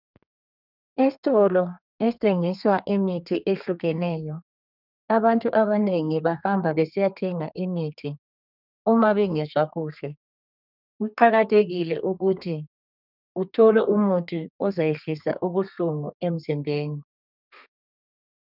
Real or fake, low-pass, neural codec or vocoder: fake; 5.4 kHz; codec, 32 kHz, 1.9 kbps, SNAC